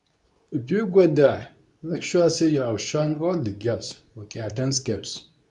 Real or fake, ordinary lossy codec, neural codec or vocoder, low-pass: fake; none; codec, 24 kHz, 0.9 kbps, WavTokenizer, medium speech release version 2; 10.8 kHz